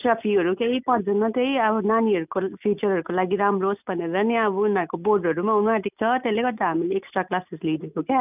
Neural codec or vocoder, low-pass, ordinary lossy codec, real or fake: none; 3.6 kHz; none; real